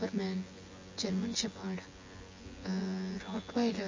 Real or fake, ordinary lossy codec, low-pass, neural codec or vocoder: fake; MP3, 32 kbps; 7.2 kHz; vocoder, 24 kHz, 100 mel bands, Vocos